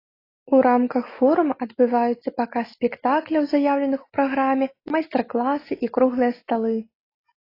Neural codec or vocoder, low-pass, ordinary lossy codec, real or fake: none; 5.4 kHz; AAC, 24 kbps; real